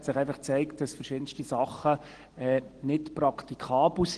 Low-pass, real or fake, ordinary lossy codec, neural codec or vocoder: 9.9 kHz; real; Opus, 16 kbps; none